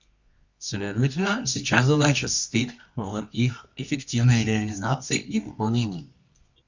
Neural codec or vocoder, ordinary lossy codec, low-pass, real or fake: codec, 24 kHz, 0.9 kbps, WavTokenizer, medium music audio release; Opus, 64 kbps; 7.2 kHz; fake